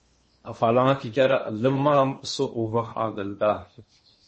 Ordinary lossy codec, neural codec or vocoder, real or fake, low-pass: MP3, 32 kbps; codec, 16 kHz in and 24 kHz out, 0.8 kbps, FocalCodec, streaming, 65536 codes; fake; 10.8 kHz